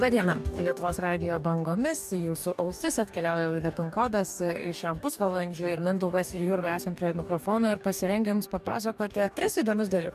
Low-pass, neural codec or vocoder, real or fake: 14.4 kHz; codec, 44.1 kHz, 2.6 kbps, DAC; fake